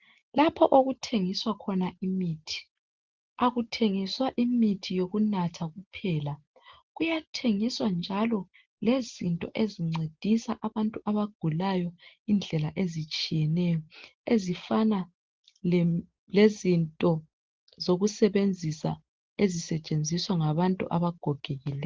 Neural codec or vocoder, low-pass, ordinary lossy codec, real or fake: none; 7.2 kHz; Opus, 16 kbps; real